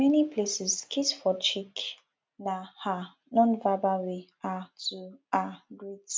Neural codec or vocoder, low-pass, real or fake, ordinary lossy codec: none; none; real; none